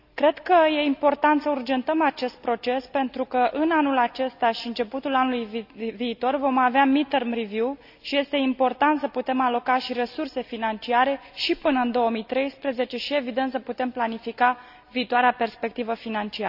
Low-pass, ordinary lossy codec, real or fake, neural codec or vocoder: 5.4 kHz; none; real; none